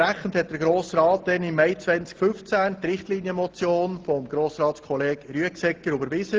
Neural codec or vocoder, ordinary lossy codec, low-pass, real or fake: none; Opus, 16 kbps; 7.2 kHz; real